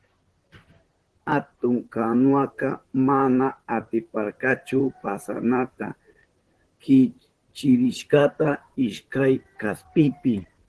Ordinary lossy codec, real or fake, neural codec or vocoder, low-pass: Opus, 16 kbps; fake; vocoder, 44.1 kHz, 128 mel bands, Pupu-Vocoder; 10.8 kHz